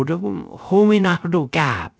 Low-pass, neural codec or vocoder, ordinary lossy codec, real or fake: none; codec, 16 kHz, 0.3 kbps, FocalCodec; none; fake